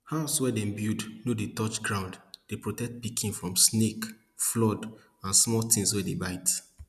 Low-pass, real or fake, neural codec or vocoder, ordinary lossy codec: 14.4 kHz; real; none; none